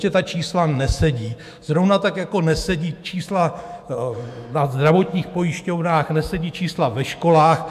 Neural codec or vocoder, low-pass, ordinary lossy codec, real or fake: autoencoder, 48 kHz, 128 numbers a frame, DAC-VAE, trained on Japanese speech; 14.4 kHz; AAC, 96 kbps; fake